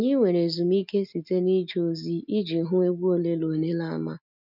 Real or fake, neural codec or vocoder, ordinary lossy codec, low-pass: real; none; none; 5.4 kHz